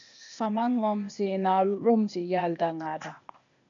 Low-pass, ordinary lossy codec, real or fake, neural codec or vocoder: 7.2 kHz; MP3, 64 kbps; fake; codec, 16 kHz, 0.8 kbps, ZipCodec